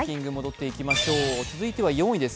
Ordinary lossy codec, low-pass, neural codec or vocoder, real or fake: none; none; none; real